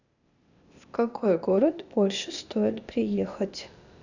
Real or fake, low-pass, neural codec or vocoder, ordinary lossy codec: fake; 7.2 kHz; codec, 16 kHz, 0.8 kbps, ZipCodec; none